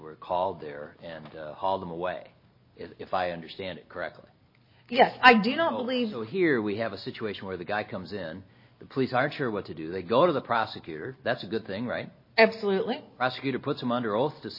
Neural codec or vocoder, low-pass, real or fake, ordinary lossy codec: none; 5.4 kHz; real; MP3, 24 kbps